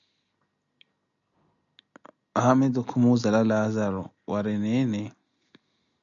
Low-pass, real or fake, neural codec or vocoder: 7.2 kHz; real; none